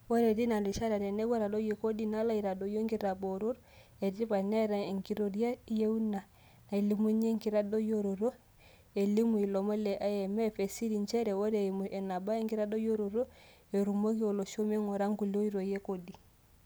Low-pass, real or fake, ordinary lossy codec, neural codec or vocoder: none; real; none; none